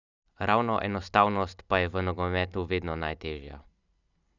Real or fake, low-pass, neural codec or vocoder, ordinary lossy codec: real; 7.2 kHz; none; none